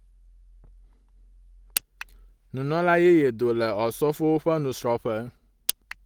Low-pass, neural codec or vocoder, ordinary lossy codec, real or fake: 19.8 kHz; none; Opus, 24 kbps; real